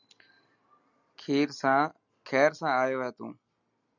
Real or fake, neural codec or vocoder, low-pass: real; none; 7.2 kHz